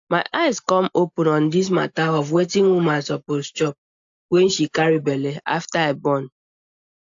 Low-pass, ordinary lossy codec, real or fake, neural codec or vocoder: 7.2 kHz; AAC, 64 kbps; real; none